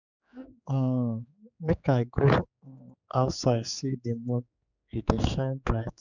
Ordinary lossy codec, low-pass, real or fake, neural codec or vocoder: none; 7.2 kHz; fake; codec, 16 kHz, 4 kbps, X-Codec, HuBERT features, trained on general audio